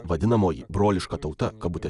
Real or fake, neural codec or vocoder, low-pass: real; none; 10.8 kHz